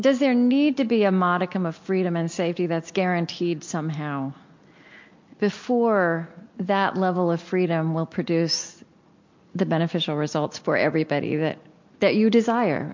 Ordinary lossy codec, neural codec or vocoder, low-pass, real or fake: MP3, 48 kbps; none; 7.2 kHz; real